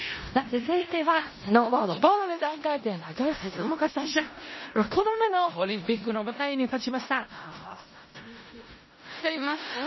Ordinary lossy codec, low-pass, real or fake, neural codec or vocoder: MP3, 24 kbps; 7.2 kHz; fake; codec, 16 kHz in and 24 kHz out, 0.4 kbps, LongCat-Audio-Codec, four codebook decoder